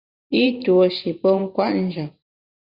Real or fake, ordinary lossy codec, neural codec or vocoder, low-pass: fake; Opus, 64 kbps; vocoder, 44.1 kHz, 128 mel bands every 512 samples, BigVGAN v2; 5.4 kHz